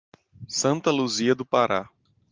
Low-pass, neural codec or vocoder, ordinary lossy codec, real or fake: 7.2 kHz; none; Opus, 32 kbps; real